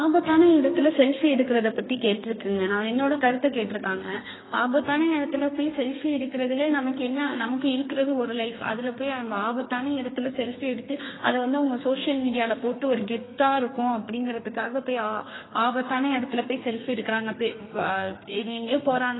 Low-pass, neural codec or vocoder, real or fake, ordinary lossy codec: 7.2 kHz; codec, 32 kHz, 1.9 kbps, SNAC; fake; AAC, 16 kbps